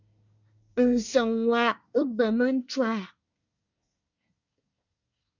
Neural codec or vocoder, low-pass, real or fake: codec, 24 kHz, 1 kbps, SNAC; 7.2 kHz; fake